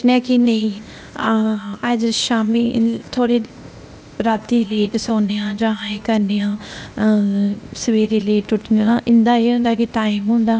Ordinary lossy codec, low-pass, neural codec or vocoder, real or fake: none; none; codec, 16 kHz, 0.8 kbps, ZipCodec; fake